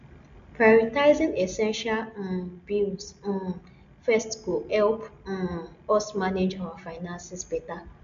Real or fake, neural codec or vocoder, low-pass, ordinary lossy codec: real; none; 7.2 kHz; MP3, 64 kbps